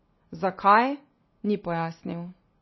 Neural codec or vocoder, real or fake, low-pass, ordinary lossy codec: none; real; 7.2 kHz; MP3, 24 kbps